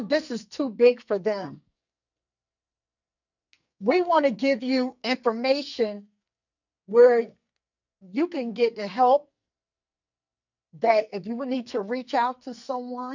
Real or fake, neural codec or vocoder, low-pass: fake; codec, 44.1 kHz, 2.6 kbps, SNAC; 7.2 kHz